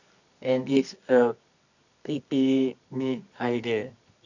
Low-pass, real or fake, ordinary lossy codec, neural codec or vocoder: 7.2 kHz; fake; none; codec, 24 kHz, 0.9 kbps, WavTokenizer, medium music audio release